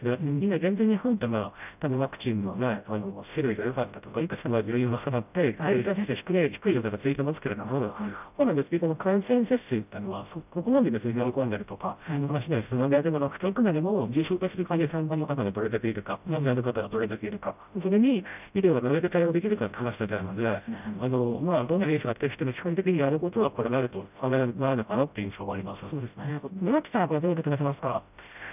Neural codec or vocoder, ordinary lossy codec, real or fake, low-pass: codec, 16 kHz, 0.5 kbps, FreqCodec, smaller model; none; fake; 3.6 kHz